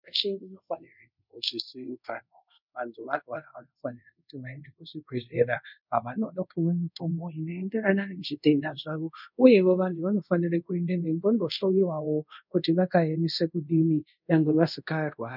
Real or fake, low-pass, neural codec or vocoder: fake; 5.4 kHz; codec, 24 kHz, 0.5 kbps, DualCodec